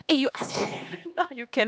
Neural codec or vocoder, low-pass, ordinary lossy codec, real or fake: codec, 16 kHz, 2 kbps, X-Codec, HuBERT features, trained on LibriSpeech; none; none; fake